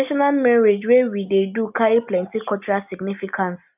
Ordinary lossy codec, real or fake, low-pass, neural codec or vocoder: none; real; 3.6 kHz; none